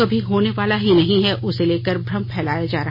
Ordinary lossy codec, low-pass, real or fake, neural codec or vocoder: MP3, 24 kbps; 5.4 kHz; real; none